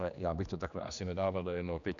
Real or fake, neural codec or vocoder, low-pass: fake; codec, 16 kHz, 2 kbps, X-Codec, HuBERT features, trained on general audio; 7.2 kHz